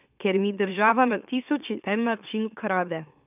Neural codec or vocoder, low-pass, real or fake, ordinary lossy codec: autoencoder, 44.1 kHz, a latent of 192 numbers a frame, MeloTTS; 3.6 kHz; fake; none